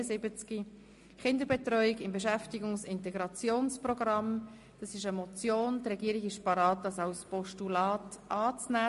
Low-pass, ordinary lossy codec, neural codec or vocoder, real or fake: 14.4 kHz; MP3, 48 kbps; none; real